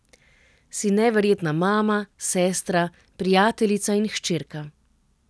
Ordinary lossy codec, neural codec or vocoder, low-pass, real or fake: none; none; none; real